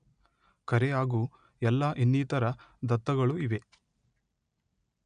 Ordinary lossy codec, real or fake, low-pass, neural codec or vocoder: none; real; 9.9 kHz; none